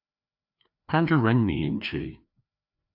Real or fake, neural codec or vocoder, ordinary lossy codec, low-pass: fake; codec, 16 kHz, 2 kbps, FreqCodec, larger model; AAC, 48 kbps; 5.4 kHz